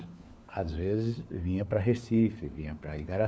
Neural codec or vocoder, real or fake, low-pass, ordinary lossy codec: codec, 16 kHz, 4 kbps, FunCodec, trained on LibriTTS, 50 frames a second; fake; none; none